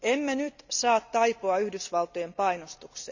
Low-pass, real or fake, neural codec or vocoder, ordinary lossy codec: 7.2 kHz; real; none; none